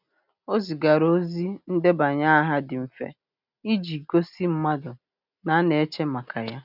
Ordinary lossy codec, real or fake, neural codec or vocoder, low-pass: none; real; none; 5.4 kHz